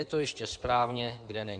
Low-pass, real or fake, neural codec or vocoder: 9.9 kHz; fake; codec, 16 kHz in and 24 kHz out, 2.2 kbps, FireRedTTS-2 codec